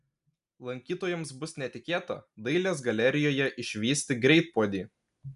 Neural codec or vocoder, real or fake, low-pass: none; real; 10.8 kHz